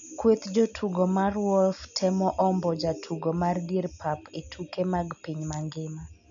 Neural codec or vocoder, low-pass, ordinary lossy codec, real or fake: none; 7.2 kHz; none; real